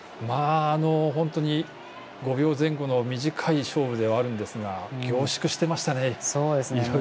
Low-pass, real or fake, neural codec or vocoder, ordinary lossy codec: none; real; none; none